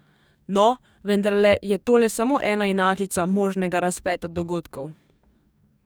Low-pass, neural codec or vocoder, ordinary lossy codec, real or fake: none; codec, 44.1 kHz, 2.6 kbps, DAC; none; fake